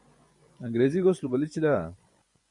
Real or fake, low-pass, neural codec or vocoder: fake; 10.8 kHz; vocoder, 24 kHz, 100 mel bands, Vocos